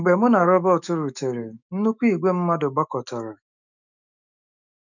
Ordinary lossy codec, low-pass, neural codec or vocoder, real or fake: none; 7.2 kHz; autoencoder, 48 kHz, 128 numbers a frame, DAC-VAE, trained on Japanese speech; fake